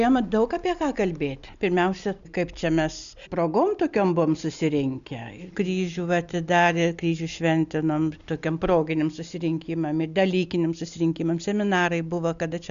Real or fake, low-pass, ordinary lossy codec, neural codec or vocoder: real; 7.2 kHz; MP3, 96 kbps; none